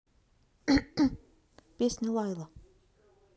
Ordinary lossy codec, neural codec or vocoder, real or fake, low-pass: none; none; real; none